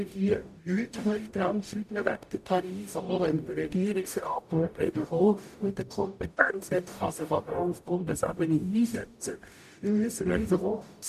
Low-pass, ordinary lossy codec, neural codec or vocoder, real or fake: 14.4 kHz; none; codec, 44.1 kHz, 0.9 kbps, DAC; fake